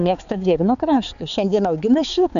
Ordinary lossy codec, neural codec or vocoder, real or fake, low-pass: MP3, 96 kbps; codec, 16 kHz, 4 kbps, X-Codec, HuBERT features, trained on balanced general audio; fake; 7.2 kHz